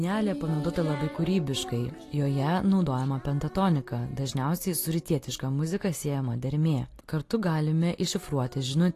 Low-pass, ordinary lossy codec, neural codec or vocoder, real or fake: 14.4 kHz; AAC, 48 kbps; none; real